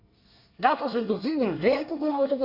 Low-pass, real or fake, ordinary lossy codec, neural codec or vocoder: 5.4 kHz; fake; none; codec, 24 kHz, 1 kbps, SNAC